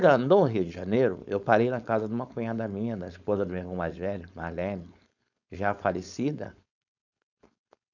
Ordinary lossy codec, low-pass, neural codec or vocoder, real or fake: none; 7.2 kHz; codec, 16 kHz, 4.8 kbps, FACodec; fake